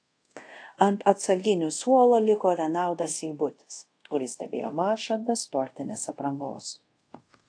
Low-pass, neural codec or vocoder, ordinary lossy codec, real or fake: 9.9 kHz; codec, 24 kHz, 0.5 kbps, DualCodec; AAC, 48 kbps; fake